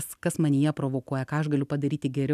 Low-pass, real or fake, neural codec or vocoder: 14.4 kHz; real; none